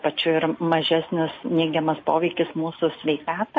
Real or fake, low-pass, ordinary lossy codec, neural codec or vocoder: real; 7.2 kHz; MP3, 32 kbps; none